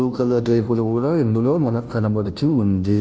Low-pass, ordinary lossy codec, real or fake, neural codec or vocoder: none; none; fake; codec, 16 kHz, 0.5 kbps, FunCodec, trained on Chinese and English, 25 frames a second